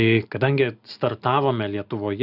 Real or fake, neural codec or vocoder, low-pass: real; none; 5.4 kHz